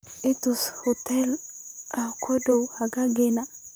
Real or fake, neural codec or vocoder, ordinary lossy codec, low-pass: fake; vocoder, 44.1 kHz, 128 mel bands, Pupu-Vocoder; none; none